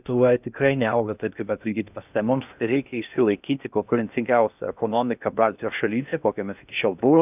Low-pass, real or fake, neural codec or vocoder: 3.6 kHz; fake; codec, 16 kHz in and 24 kHz out, 0.6 kbps, FocalCodec, streaming, 2048 codes